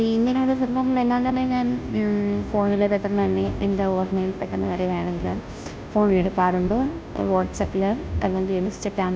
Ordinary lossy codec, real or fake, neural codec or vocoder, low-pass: none; fake; codec, 16 kHz, 0.5 kbps, FunCodec, trained on Chinese and English, 25 frames a second; none